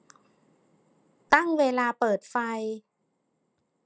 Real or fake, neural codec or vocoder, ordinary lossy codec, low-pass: real; none; none; none